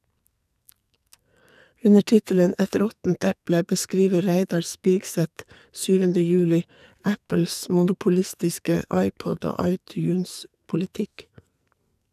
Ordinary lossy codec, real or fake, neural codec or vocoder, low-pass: none; fake; codec, 44.1 kHz, 2.6 kbps, SNAC; 14.4 kHz